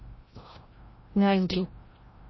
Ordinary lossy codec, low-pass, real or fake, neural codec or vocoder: MP3, 24 kbps; 7.2 kHz; fake; codec, 16 kHz, 0.5 kbps, FreqCodec, larger model